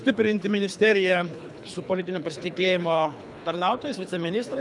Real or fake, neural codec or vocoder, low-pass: fake; codec, 24 kHz, 3 kbps, HILCodec; 10.8 kHz